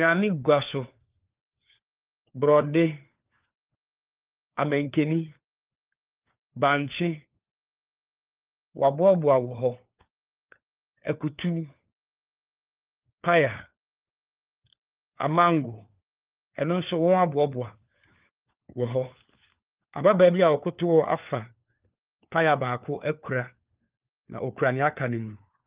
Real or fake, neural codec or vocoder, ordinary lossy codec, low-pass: fake; codec, 16 kHz, 4 kbps, FunCodec, trained on LibriTTS, 50 frames a second; Opus, 32 kbps; 3.6 kHz